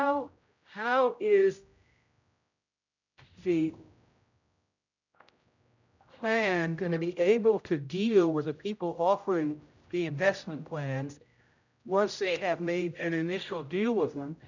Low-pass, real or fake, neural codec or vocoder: 7.2 kHz; fake; codec, 16 kHz, 0.5 kbps, X-Codec, HuBERT features, trained on general audio